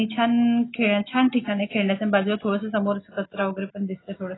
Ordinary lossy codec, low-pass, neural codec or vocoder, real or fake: AAC, 16 kbps; 7.2 kHz; none; real